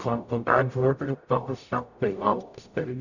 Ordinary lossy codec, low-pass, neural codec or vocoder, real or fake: none; 7.2 kHz; codec, 44.1 kHz, 0.9 kbps, DAC; fake